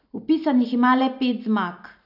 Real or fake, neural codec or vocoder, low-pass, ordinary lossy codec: real; none; 5.4 kHz; none